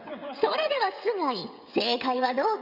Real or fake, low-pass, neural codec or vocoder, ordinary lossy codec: fake; 5.4 kHz; codec, 16 kHz, 16 kbps, FreqCodec, smaller model; Opus, 64 kbps